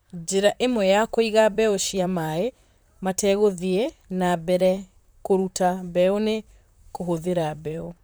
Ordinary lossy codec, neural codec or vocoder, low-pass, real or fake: none; vocoder, 44.1 kHz, 128 mel bands, Pupu-Vocoder; none; fake